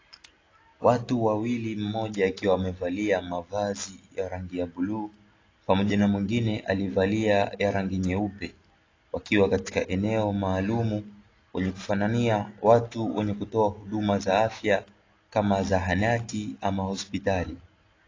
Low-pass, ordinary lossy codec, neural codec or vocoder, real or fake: 7.2 kHz; AAC, 32 kbps; none; real